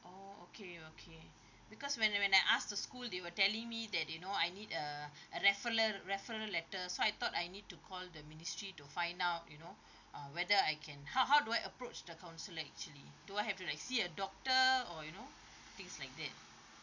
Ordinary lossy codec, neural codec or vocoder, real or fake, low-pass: none; none; real; 7.2 kHz